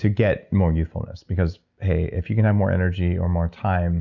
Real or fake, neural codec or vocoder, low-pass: real; none; 7.2 kHz